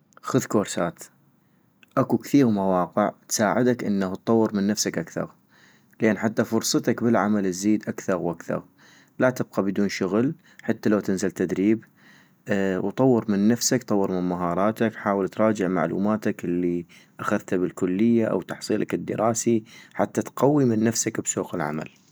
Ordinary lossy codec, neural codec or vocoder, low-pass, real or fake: none; none; none; real